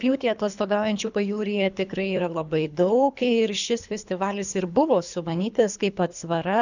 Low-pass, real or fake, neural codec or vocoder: 7.2 kHz; fake; codec, 24 kHz, 3 kbps, HILCodec